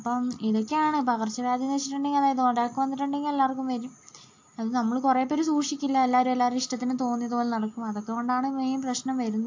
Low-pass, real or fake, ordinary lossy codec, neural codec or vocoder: 7.2 kHz; real; AAC, 48 kbps; none